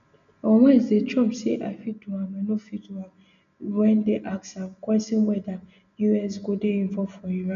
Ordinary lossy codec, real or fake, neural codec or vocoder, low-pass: none; real; none; 7.2 kHz